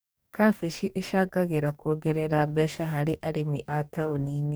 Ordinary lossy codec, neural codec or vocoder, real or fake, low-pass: none; codec, 44.1 kHz, 2.6 kbps, DAC; fake; none